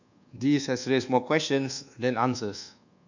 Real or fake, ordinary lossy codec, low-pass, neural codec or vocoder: fake; none; 7.2 kHz; codec, 24 kHz, 1.2 kbps, DualCodec